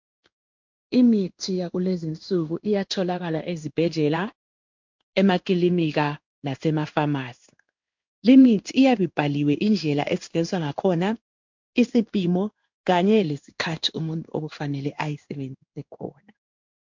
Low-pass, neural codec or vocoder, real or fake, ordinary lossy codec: 7.2 kHz; codec, 16 kHz in and 24 kHz out, 1 kbps, XY-Tokenizer; fake; MP3, 64 kbps